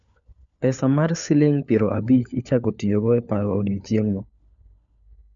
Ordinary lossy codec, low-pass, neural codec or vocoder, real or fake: none; 7.2 kHz; codec, 16 kHz, 4 kbps, FunCodec, trained on LibriTTS, 50 frames a second; fake